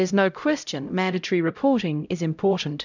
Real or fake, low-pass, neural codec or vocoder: fake; 7.2 kHz; codec, 16 kHz, 0.5 kbps, X-Codec, HuBERT features, trained on LibriSpeech